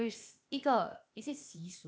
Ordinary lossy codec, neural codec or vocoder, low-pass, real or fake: none; codec, 16 kHz, 0.8 kbps, ZipCodec; none; fake